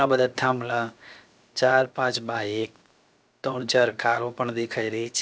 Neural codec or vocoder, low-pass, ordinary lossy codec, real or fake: codec, 16 kHz, 0.7 kbps, FocalCodec; none; none; fake